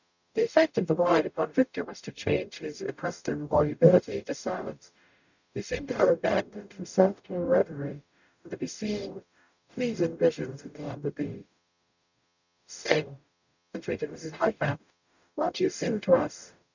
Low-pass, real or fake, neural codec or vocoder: 7.2 kHz; fake; codec, 44.1 kHz, 0.9 kbps, DAC